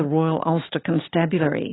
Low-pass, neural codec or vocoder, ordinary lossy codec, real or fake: 7.2 kHz; none; AAC, 16 kbps; real